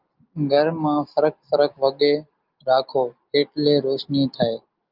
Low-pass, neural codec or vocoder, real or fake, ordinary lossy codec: 5.4 kHz; none; real; Opus, 24 kbps